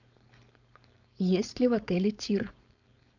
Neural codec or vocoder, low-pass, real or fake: codec, 16 kHz, 4.8 kbps, FACodec; 7.2 kHz; fake